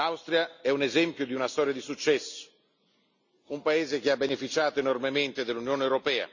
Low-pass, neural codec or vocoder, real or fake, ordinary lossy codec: 7.2 kHz; none; real; none